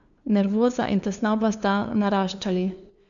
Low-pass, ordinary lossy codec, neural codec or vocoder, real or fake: 7.2 kHz; none; codec, 16 kHz, 2 kbps, FunCodec, trained on LibriTTS, 25 frames a second; fake